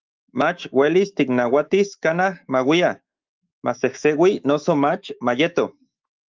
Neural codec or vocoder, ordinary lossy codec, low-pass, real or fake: autoencoder, 48 kHz, 128 numbers a frame, DAC-VAE, trained on Japanese speech; Opus, 32 kbps; 7.2 kHz; fake